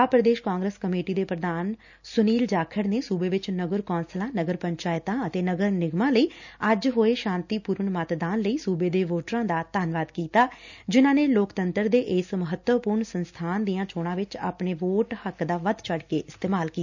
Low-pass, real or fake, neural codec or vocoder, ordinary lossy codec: 7.2 kHz; real; none; none